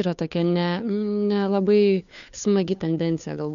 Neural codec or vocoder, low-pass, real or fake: codec, 16 kHz, 2 kbps, FunCodec, trained on Chinese and English, 25 frames a second; 7.2 kHz; fake